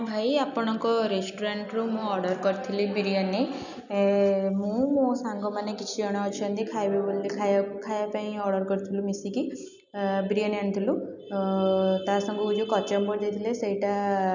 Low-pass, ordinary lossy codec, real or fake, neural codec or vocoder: 7.2 kHz; none; real; none